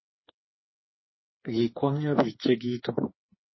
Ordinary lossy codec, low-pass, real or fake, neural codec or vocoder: MP3, 24 kbps; 7.2 kHz; fake; codec, 16 kHz, 4 kbps, FreqCodec, smaller model